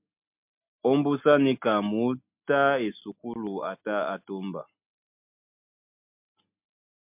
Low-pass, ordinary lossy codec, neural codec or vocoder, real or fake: 3.6 kHz; MP3, 32 kbps; none; real